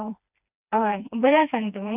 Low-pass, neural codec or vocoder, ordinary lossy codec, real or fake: 3.6 kHz; codec, 16 kHz, 2 kbps, FreqCodec, smaller model; none; fake